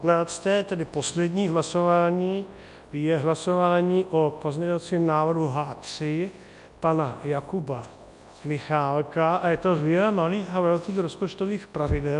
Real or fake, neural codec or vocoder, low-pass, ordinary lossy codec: fake; codec, 24 kHz, 0.9 kbps, WavTokenizer, large speech release; 10.8 kHz; MP3, 64 kbps